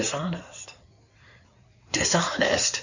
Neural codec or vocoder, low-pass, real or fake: codec, 16 kHz in and 24 kHz out, 2.2 kbps, FireRedTTS-2 codec; 7.2 kHz; fake